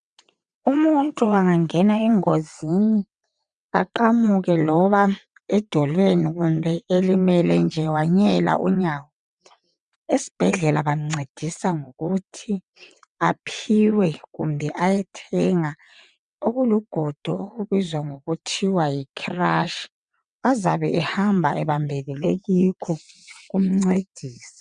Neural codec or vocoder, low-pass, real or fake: vocoder, 22.05 kHz, 80 mel bands, WaveNeXt; 9.9 kHz; fake